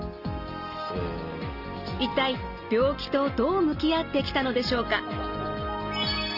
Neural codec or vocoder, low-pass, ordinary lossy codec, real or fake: none; 5.4 kHz; Opus, 24 kbps; real